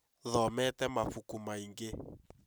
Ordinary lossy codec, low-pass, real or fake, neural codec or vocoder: none; none; real; none